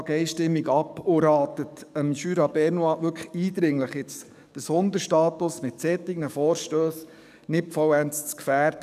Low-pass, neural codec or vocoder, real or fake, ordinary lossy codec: 14.4 kHz; codec, 44.1 kHz, 7.8 kbps, DAC; fake; none